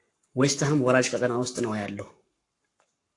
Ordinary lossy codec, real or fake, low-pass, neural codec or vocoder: AAC, 48 kbps; fake; 10.8 kHz; codec, 44.1 kHz, 7.8 kbps, Pupu-Codec